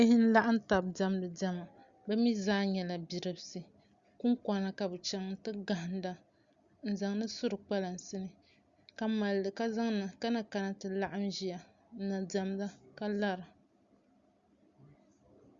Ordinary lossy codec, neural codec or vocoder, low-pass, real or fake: Opus, 64 kbps; none; 7.2 kHz; real